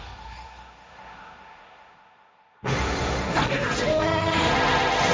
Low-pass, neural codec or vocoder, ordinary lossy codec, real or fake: none; codec, 16 kHz, 1.1 kbps, Voila-Tokenizer; none; fake